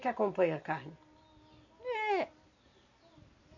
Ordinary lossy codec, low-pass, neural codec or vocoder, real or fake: none; 7.2 kHz; none; real